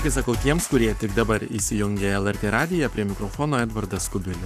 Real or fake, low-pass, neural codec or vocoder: fake; 14.4 kHz; codec, 44.1 kHz, 7.8 kbps, Pupu-Codec